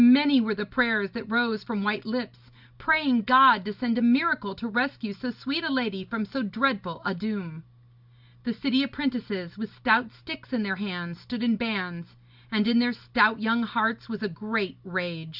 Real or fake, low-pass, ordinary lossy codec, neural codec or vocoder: real; 5.4 kHz; Opus, 64 kbps; none